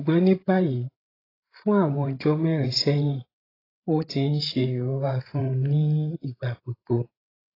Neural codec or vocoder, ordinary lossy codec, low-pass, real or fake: codec, 16 kHz, 16 kbps, FreqCodec, larger model; AAC, 24 kbps; 5.4 kHz; fake